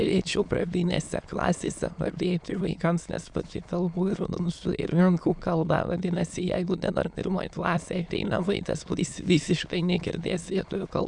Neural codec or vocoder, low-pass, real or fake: autoencoder, 22.05 kHz, a latent of 192 numbers a frame, VITS, trained on many speakers; 9.9 kHz; fake